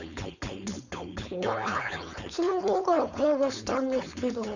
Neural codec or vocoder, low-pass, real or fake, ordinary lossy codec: codec, 16 kHz, 4.8 kbps, FACodec; 7.2 kHz; fake; none